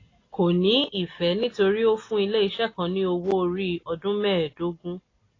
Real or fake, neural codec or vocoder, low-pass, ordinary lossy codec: real; none; 7.2 kHz; AAC, 32 kbps